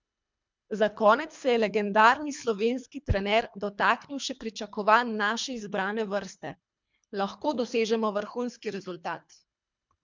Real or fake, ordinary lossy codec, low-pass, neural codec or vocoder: fake; MP3, 64 kbps; 7.2 kHz; codec, 24 kHz, 3 kbps, HILCodec